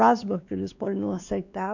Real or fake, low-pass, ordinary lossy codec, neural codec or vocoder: fake; 7.2 kHz; none; codec, 16 kHz, 2 kbps, X-Codec, WavLM features, trained on Multilingual LibriSpeech